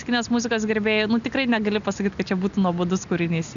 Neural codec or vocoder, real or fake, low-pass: none; real; 7.2 kHz